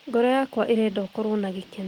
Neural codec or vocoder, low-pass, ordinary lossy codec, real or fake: none; 19.8 kHz; Opus, 24 kbps; real